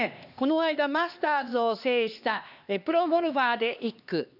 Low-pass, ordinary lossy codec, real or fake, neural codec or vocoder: 5.4 kHz; none; fake; codec, 16 kHz, 2 kbps, X-Codec, HuBERT features, trained on LibriSpeech